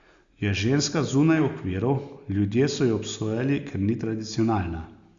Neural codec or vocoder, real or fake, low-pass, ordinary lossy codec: none; real; 7.2 kHz; Opus, 64 kbps